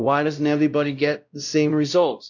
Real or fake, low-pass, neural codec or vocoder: fake; 7.2 kHz; codec, 16 kHz, 0.5 kbps, X-Codec, WavLM features, trained on Multilingual LibriSpeech